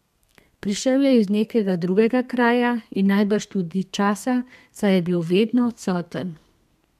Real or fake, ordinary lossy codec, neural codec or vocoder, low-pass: fake; MP3, 96 kbps; codec, 32 kHz, 1.9 kbps, SNAC; 14.4 kHz